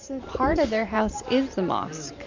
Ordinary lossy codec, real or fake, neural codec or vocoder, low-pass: MP3, 64 kbps; fake; vocoder, 44.1 kHz, 128 mel bands every 512 samples, BigVGAN v2; 7.2 kHz